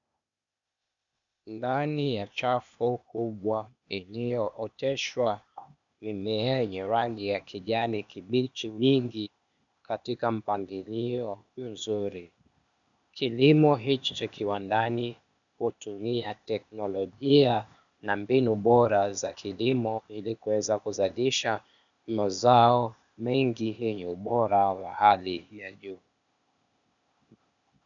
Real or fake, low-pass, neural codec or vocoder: fake; 7.2 kHz; codec, 16 kHz, 0.8 kbps, ZipCodec